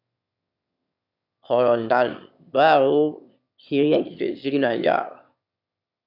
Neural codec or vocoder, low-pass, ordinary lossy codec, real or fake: autoencoder, 22.05 kHz, a latent of 192 numbers a frame, VITS, trained on one speaker; 5.4 kHz; AAC, 48 kbps; fake